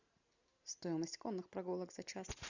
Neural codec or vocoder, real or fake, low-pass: none; real; 7.2 kHz